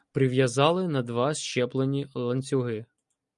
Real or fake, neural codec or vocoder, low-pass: real; none; 10.8 kHz